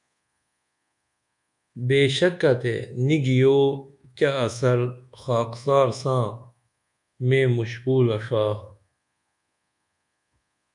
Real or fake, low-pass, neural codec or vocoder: fake; 10.8 kHz; codec, 24 kHz, 1.2 kbps, DualCodec